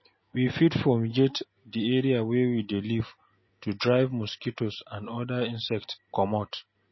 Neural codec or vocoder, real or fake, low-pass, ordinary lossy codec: none; real; 7.2 kHz; MP3, 24 kbps